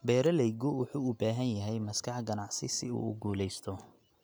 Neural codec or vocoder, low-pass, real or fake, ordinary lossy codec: none; none; real; none